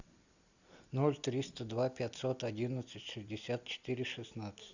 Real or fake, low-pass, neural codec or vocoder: real; 7.2 kHz; none